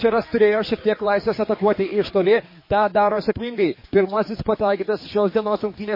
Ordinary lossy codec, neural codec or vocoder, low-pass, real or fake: MP3, 24 kbps; codec, 16 kHz, 4 kbps, X-Codec, HuBERT features, trained on general audio; 5.4 kHz; fake